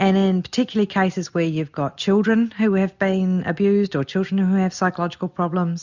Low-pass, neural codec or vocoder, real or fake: 7.2 kHz; none; real